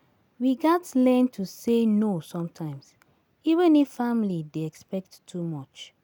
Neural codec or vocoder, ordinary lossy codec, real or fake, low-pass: none; none; real; none